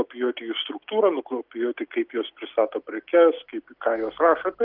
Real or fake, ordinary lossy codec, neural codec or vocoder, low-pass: real; Opus, 32 kbps; none; 5.4 kHz